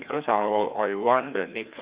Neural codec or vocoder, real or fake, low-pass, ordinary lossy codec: codec, 16 kHz, 2 kbps, FreqCodec, larger model; fake; 3.6 kHz; Opus, 64 kbps